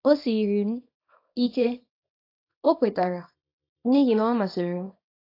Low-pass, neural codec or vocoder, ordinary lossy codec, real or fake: 5.4 kHz; codec, 24 kHz, 0.9 kbps, WavTokenizer, small release; AAC, 32 kbps; fake